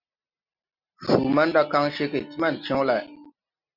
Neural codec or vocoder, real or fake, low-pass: none; real; 5.4 kHz